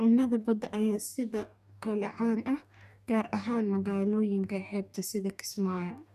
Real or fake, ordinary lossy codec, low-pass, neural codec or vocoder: fake; none; 14.4 kHz; codec, 44.1 kHz, 2.6 kbps, DAC